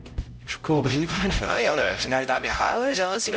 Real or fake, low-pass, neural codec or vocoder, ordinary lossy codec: fake; none; codec, 16 kHz, 0.5 kbps, X-Codec, HuBERT features, trained on LibriSpeech; none